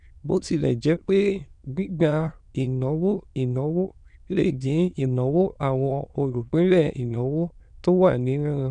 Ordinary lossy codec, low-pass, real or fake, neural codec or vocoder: none; 9.9 kHz; fake; autoencoder, 22.05 kHz, a latent of 192 numbers a frame, VITS, trained on many speakers